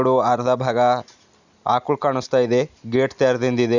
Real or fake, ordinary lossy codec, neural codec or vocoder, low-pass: real; none; none; 7.2 kHz